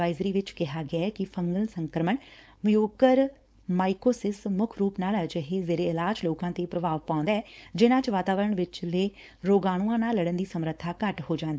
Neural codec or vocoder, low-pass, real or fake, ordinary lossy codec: codec, 16 kHz, 4.8 kbps, FACodec; none; fake; none